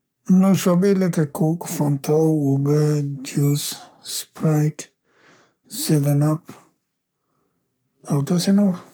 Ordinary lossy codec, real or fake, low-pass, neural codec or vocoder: none; fake; none; codec, 44.1 kHz, 3.4 kbps, Pupu-Codec